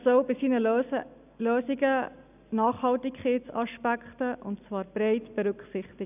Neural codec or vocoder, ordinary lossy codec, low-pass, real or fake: none; none; 3.6 kHz; real